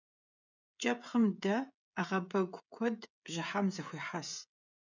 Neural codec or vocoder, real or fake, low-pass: codec, 16 kHz, 16 kbps, FreqCodec, smaller model; fake; 7.2 kHz